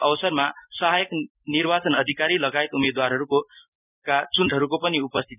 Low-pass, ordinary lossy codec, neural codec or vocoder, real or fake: 3.6 kHz; none; none; real